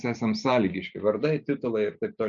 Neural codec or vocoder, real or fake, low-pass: none; real; 7.2 kHz